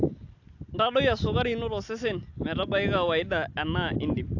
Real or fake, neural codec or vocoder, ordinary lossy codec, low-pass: real; none; AAC, 48 kbps; 7.2 kHz